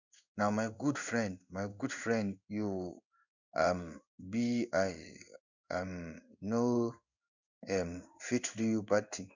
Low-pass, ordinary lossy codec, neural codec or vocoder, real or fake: 7.2 kHz; none; codec, 16 kHz in and 24 kHz out, 1 kbps, XY-Tokenizer; fake